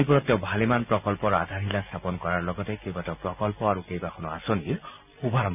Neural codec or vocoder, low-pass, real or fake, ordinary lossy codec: none; 3.6 kHz; real; none